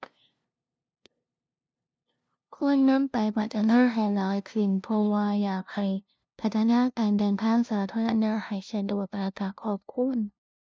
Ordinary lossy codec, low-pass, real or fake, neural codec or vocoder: none; none; fake; codec, 16 kHz, 0.5 kbps, FunCodec, trained on LibriTTS, 25 frames a second